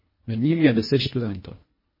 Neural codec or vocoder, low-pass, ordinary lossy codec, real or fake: codec, 24 kHz, 1.5 kbps, HILCodec; 5.4 kHz; MP3, 24 kbps; fake